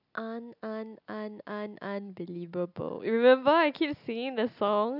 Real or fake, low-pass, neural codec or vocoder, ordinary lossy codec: real; 5.4 kHz; none; none